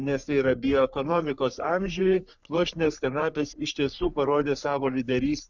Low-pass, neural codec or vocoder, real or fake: 7.2 kHz; codec, 44.1 kHz, 3.4 kbps, Pupu-Codec; fake